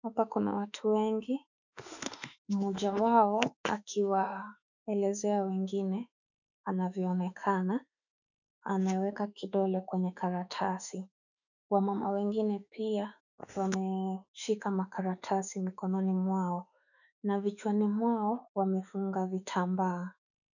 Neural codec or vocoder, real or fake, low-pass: autoencoder, 48 kHz, 32 numbers a frame, DAC-VAE, trained on Japanese speech; fake; 7.2 kHz